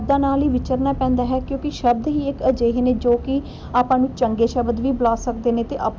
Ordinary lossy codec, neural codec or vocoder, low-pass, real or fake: none; none; none; real